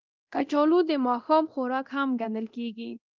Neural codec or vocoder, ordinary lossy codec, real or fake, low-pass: codec, 24 kHz, 0.9 kbps, DualCodec; Opus, 32 kbps; fake; 7.2 kHz